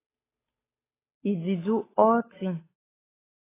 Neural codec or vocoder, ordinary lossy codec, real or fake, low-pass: codec, 16 kHz, 8 kbps, FunCodec, trained on Chinese and English, 25 frames a second; AAC, 16 kbps; fake; 3.6 kHz